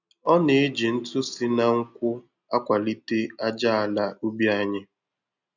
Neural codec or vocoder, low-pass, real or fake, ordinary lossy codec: none; 7.2 kHz; real; none